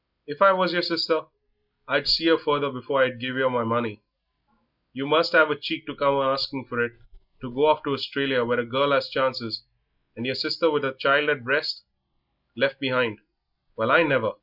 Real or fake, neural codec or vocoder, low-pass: real; none; 5.4 kHz